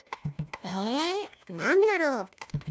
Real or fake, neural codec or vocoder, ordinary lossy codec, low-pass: fake; codec, 16 kHz, 1 kbps, FunCodec, trained on LibriTTS, 50 frames a second; none; none